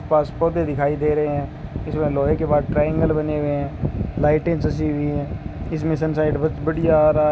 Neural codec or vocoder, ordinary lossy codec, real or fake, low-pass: none; none; real; none